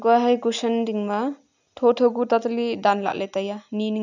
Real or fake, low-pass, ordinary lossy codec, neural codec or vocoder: real; 7.2 kHz; none; none